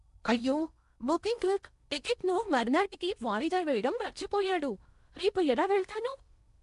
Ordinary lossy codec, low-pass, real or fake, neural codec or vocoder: none; 10.8 kHz; fake; codec, 16 kHz in and 24 kHz out, 0.8 kbps, FocalCodec, streaming, 65536 codes